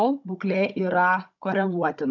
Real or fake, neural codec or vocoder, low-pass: fake; codec, 16 kHz, 16 kbps, FunCodec, trained on Chinese and English, 50 frames a second; 7.2 kHz